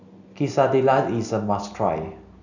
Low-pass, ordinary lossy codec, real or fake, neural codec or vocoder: 7.2 kHz; none; real; none